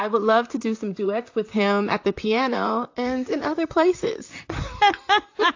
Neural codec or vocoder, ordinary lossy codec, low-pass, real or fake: vocoder, 44.1 kHz, 128 mel bands, Pupu-Vocoder; AAC, 48 kbps; 7.2 kHz; fake